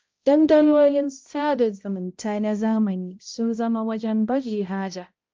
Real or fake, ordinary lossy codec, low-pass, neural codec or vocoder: fake; Opus, 24 kbps; 7.2 kHz; codec, 16 kHz, 0.5 kbps, X-Codec, HuBERT features, trained on balanced general audio